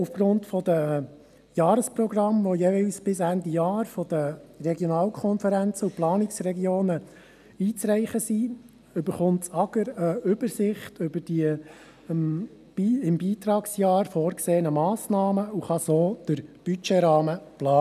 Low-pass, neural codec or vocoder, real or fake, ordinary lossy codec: 14.4 kHz; none; real; none